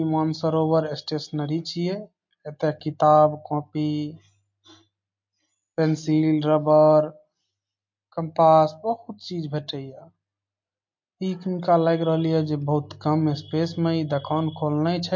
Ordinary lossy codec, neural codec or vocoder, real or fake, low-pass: MP3, 48 kbps; none; real; 7.2 kHz